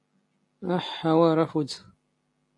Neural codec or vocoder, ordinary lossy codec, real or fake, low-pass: none; AAC, 48 kbps; real; 10.8 kHz